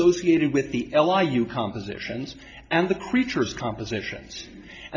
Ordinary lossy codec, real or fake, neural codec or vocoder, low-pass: MP3, 48 kbps; real; none; 7.2 kHz